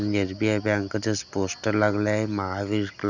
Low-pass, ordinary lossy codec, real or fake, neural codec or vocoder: 7.2 kHz; none; real; none